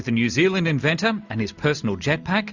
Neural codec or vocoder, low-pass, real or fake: none; 7.2 kHz; real